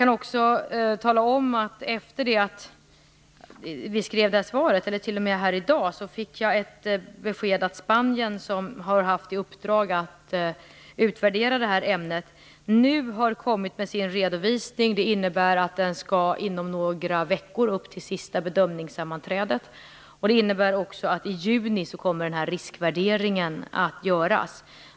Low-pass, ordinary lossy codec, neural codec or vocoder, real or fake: none; none; none; real